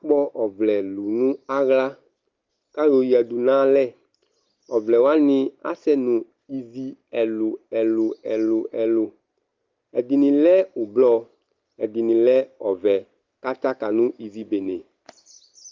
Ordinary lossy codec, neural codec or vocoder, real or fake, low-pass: Opus, 32 kbps; none; real; 7.2 kHz